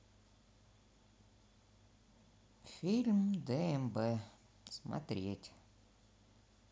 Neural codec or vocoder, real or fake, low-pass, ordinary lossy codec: none; real; none; none